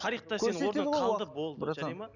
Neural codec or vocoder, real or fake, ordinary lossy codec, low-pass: none; real; none; 7.2 kHz